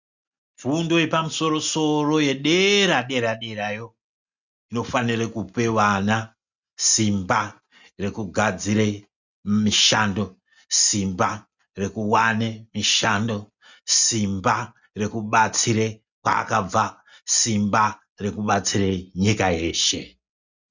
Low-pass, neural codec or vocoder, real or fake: 7.2 kHz; none; real